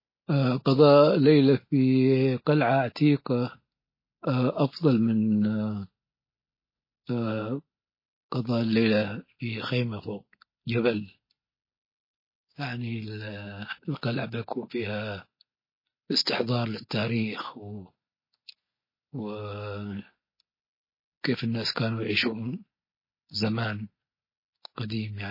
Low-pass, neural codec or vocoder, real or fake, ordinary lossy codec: 5.4 kHz; none; real; MP3, 24 kbps